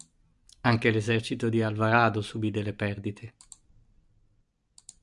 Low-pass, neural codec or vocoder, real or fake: 10.8 kHz; none; real